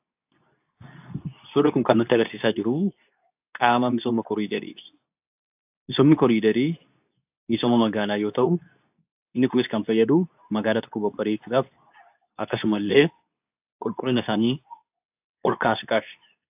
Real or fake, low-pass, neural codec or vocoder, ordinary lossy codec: fake; 3.6 kHz; codec, 24 kHz, 0.9 kbps, WavTokenizer, medium speech release version 2; AAC, 32 kbps